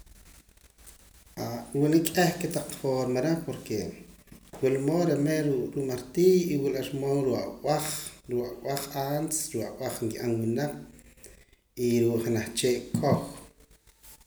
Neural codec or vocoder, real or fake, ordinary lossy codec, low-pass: none; real; none; none